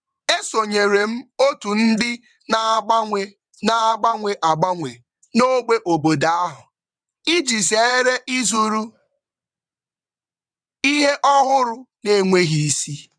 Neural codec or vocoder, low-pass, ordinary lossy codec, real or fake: vocoder, 22.05 kHz, 80 mel bands, WaveNeXt; 9.9 kHz; none; fake